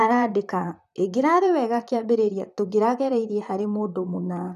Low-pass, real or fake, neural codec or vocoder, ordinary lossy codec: 14.4 kHz; fake; vocoder, 44.1 kHz, 128 mel bands, Pupu-Vocoder; none